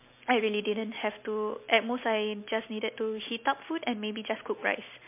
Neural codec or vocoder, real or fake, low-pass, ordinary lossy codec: none; real; 3.6 kHz; MP3, 24 kbps